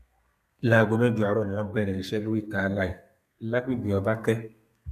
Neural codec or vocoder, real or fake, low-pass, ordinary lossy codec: codec, 32 kHz, 1.9 kbps, SNAC; fake; 14.4 kHz; AAC, 96 kbps